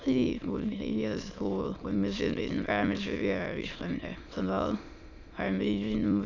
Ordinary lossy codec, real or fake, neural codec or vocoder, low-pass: none; fake; autoencoder, 22.05 kHz, a latent of 192 numbers a frame, VITS, trained on many speakers; 7.2 kHz